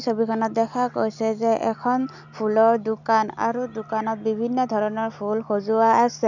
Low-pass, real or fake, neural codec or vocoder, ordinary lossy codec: 7.2 kHz; real; none; none